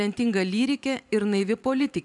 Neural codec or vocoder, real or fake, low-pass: vocoder, 44.1 kHz, 128 mel bands every 512 samples, BigVGAN v2; fake; 10.8 kHz